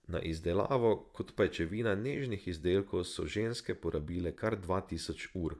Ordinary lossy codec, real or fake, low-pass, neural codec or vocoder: none; fake; none; vocoder, 24 kHz, 100 mel bands, Vocos